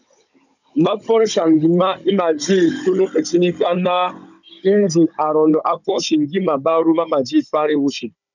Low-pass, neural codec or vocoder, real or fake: 7.2 kHz; codec, 16 kHz, 16 kbps, FunCodec, trained on Chinese and English, 50 frames a second; fake